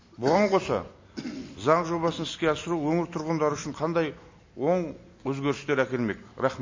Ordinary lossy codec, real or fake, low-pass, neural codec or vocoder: MP3, 32 kbps; real; 7.2 kHz; none